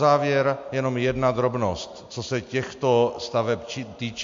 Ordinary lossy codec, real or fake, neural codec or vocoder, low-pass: MP3, 48 kbps; real; none; 7.2 kHz